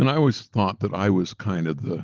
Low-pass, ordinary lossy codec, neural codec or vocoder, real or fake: 7.2 kHz; Opus, 16 kbps; none; real